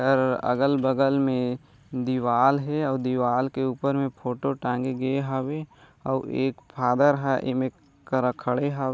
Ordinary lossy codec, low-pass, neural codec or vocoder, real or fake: none; none; none; real